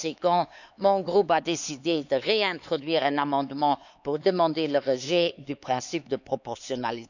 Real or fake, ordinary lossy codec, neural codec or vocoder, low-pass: fake; none; codec, 16 kHz, 4 kbps, X-Codec, HuBERT features, trained on LibriSpeech; 7.2 kHz